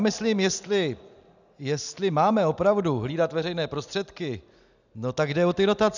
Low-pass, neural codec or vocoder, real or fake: 7.2 kHz; none; real